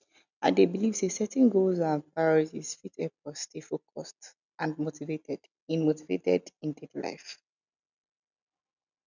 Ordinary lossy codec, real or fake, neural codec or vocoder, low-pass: none; real; none; 7.2 kHz